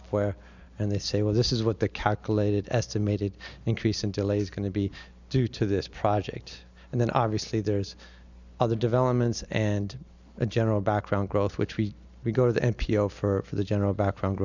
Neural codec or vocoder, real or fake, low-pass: none; real; 7.2 kHz